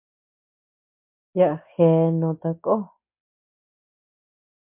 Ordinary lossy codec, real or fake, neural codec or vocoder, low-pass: MP3, 32 kbps; real; none; 3.6 kHz